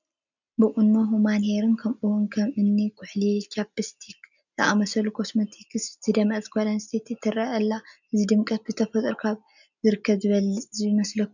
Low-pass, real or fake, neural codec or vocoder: 7.2 kHz; real; none